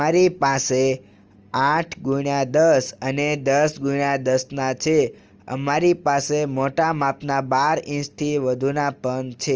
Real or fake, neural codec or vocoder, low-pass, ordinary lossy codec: real; none; 7.2 kHz; Opus, 24 kbps